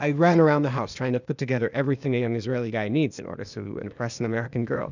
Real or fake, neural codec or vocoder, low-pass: fake; codec, 16 kHz, 0.8 kbps, ZipCodec; 7.2 kHz